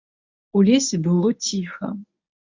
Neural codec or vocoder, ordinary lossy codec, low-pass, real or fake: codec, 24 kHz, 0.9 kbps, WavTokenizer, medium speech release version 1; AAC, 48 kbps; 7.2 kHz; fake